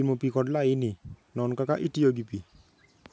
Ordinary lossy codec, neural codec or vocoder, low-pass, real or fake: none; none; none; real